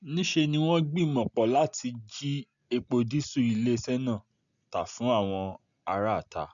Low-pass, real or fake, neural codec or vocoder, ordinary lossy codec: 7.2 kHz; real; none; none